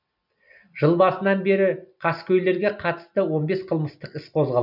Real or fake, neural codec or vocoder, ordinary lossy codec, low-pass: real; none; none; 5.4 kHz